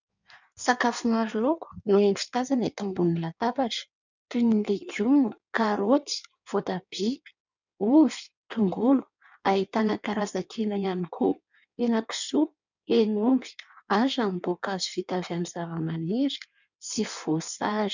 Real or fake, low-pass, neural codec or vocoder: fake; 7.2 kHz; codec, 16 kHz in and 24 kHz out, 1.1 kbps, FireRedTTS-2 codec